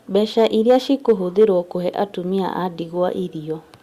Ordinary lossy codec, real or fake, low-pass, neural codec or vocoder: Opus, 64 kbps; real; 14.4 kHz; none